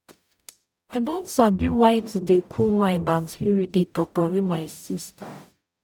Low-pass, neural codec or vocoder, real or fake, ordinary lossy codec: 19.8 kHz; codec, 44.1 kHz, 0.9 kbps, DAC; fake; none